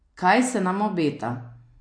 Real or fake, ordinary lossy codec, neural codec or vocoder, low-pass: real; MP3, 48 kbps; none; 9.9 kHz